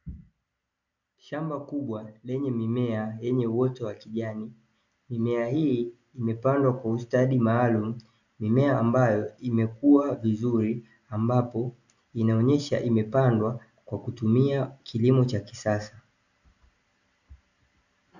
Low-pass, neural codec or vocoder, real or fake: 7.2 kHz; none; real